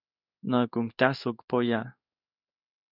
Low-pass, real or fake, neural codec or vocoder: 5.4 kHz; fake; codec, 16 kHz in and 24 kHz out, 1 kbps, XY-Tokenizer